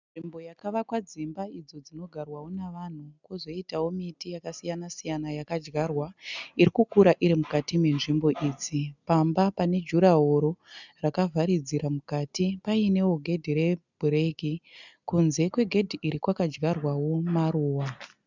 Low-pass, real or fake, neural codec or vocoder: 7.2 kHz; real; none